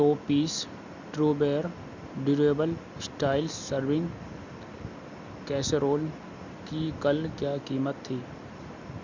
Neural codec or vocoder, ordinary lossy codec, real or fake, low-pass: none; Opus, 64 kbps; real; 7.2 kHz